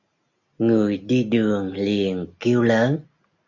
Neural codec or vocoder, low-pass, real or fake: none; 7.2 kHz; real